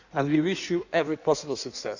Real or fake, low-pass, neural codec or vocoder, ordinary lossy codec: fake; 7.2 kHz; codec, 16 kHz in and 24 kHz out, 1.1 kbps, FireRedTTS-2 codec; none